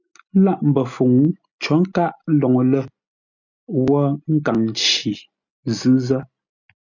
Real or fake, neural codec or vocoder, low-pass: real; none; 7.2 kHz